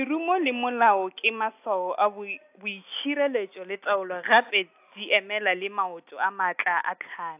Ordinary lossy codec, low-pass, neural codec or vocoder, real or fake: AAC, 32 kbps; 3.6 kHz; none; real